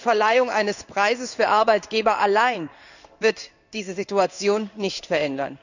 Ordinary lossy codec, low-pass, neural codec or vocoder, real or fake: none; 7.2 kHz; codec, 16 kHz in and 24 kHz out, 1 kbps, XY-Tokenizer; fake